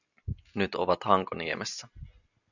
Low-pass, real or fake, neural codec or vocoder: 7.2 kHz; real; none